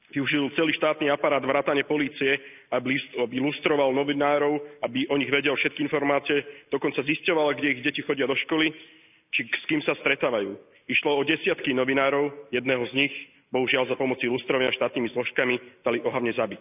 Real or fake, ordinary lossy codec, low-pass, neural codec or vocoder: real; none; 3.6 kHz; none